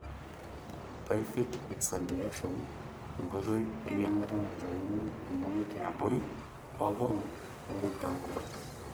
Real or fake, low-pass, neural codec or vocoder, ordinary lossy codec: fake; none; codec, 44.1 kHz, 1.7 kbps, Pupu-Codec; none